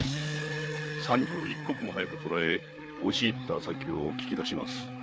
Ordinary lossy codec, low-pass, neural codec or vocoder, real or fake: none; none; codec, 16 kHz, 4 kbps, FreqCodec, larger model; fake